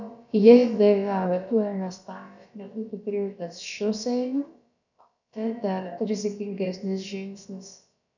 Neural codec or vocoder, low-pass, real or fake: codec, 16 kHz, about 1 kbps, DyCAST, with the encoder's durations; 7.2 kHz; fake